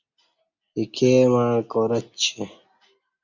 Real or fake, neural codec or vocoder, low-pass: real; none; 7.2 kHz